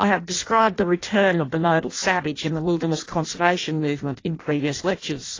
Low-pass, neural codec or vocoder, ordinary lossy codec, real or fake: 7.2 kHz; codec, 16 kHz in and 24 kHz out, 0.6 kbps, FireRedTTS-2 codec; AAC, 32 kbps; fake